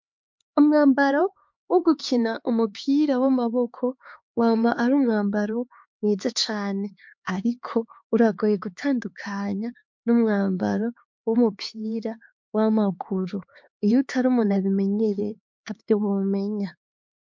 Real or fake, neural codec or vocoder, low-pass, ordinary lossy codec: fake; codec, 16 kHz, 4 kbps, X-Codec, HuBERT features, trained on LibriSpeech; 7.2 kHz; MP3, 48 kbps